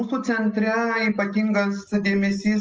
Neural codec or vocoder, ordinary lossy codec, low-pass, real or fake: none; Opus, 24 kbps; 7.2 kHz; real